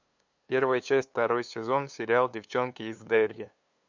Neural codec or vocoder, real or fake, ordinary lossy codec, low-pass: codec, 16 kHz, 2 kbps, FunCodec, trained on LibriTTS, 25 frames a second; fake; MP3, 64 kbps; 7.2 kHz